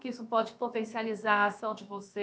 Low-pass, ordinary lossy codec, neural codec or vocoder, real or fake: none; none; codec, 16 kHz, about 1 kbps, DyCAST, with the encoder's durations; fake